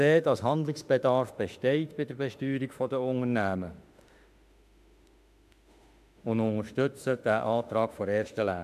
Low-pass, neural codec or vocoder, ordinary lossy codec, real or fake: 14.4 kHz; autoencoder, 48 kHz, 32 numbers a frame, DAC-VAE, trained on Japanese speech; AAC, 96 kbps; fake